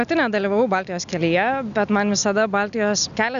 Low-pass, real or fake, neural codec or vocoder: 7.2 kHz; real; none